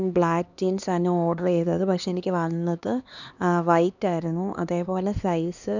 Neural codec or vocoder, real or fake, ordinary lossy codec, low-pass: codec, 16 kHz, 2 kbps, X-Codec, HuBERT features, trained on LibriSpeech; fake; none; 7.2 kHz